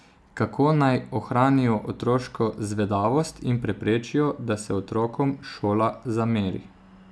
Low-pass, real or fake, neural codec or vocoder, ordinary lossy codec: none; real; none; none